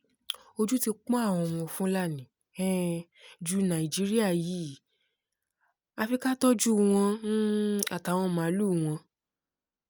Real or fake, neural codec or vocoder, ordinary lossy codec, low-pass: real; none; none; none